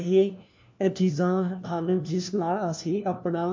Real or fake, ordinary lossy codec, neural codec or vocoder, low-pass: fake; MP3, 48 kbps; codec, 16 kHz, 1 kbps, FunCodec, trained on LibriTTS, 50 frames a second; 7.2 kHz